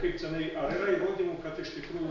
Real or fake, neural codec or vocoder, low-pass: real; none; 7.2 kHz